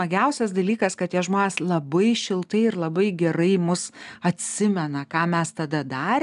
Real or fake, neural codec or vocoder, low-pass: real; none; 10.8 kHz